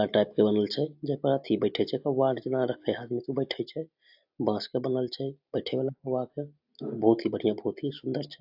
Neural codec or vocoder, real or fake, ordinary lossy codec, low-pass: none; real; AAC, 48 kbps; 5.4 kHz